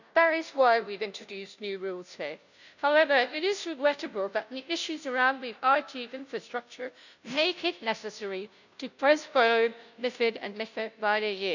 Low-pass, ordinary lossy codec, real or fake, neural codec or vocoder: 7.2 kHz; none; fake; codec, 16 kHz, 0.5 kbps, FunCodec, trained on Chinese and English, 25 frames a second